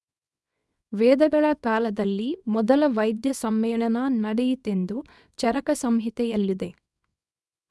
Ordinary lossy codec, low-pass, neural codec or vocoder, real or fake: none; none; codec, 24 kHz, 0.9 kbps, WavTokenizer, small release; fake